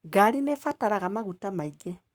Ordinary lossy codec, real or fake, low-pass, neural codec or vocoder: Opus, 64 kbps; fake; 19.8 kHz; codec, 44.1 kHz, 7.8 kbps, Pupu-Codec